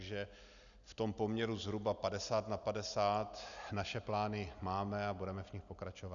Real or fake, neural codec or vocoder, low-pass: real; none; 7.2 kHz